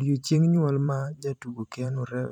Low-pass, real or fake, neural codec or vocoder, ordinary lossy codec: 19.8 kHz; fake; vocoder, 44.1 kHz, 128 mel bands every 512 samples, BigVGAN v2; none